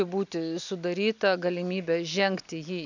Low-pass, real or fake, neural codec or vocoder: 7.2 kHz; real; none